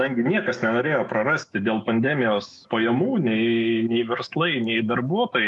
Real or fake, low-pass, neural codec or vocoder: fake; 10.8 kHz; autoencoder, 48 kHz, 128 numbers a frame, DAC-VAE, trained on Japanese speech